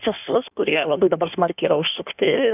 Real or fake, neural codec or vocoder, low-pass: fake; codec, 16 kHz in and 24 kHz out, 1.1 kbps, FireRedTTS-2 codec; 3.6 kHz